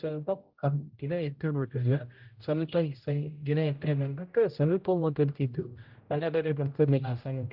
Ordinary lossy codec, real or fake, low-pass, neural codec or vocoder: Opus, 32 kbps; fake; 5.4 kHz; codec, 16 kHz, 0.5 kbps, X-Codec, HuBERT features, trained on general audio